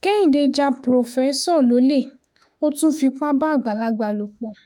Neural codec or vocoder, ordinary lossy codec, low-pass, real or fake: autoencoder, 48 kHz, 32 numbers a frame, DAC-VAE, trained on Japanese speech; none; 19.8 kHz; fake